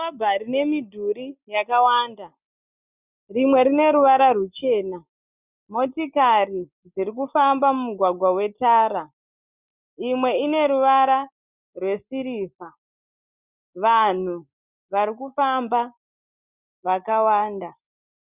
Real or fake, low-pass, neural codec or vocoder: real; 3.6 kHz; none